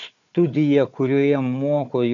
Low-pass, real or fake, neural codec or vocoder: 7.2 kHz; fake; codec, 16 kHz, 4 kbps, FunCodec, trained on Chinese and English, 50 frames a second